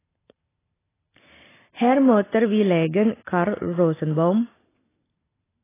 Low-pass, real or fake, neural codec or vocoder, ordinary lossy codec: 3.6 kHz; real; none; AAC, 16 kbps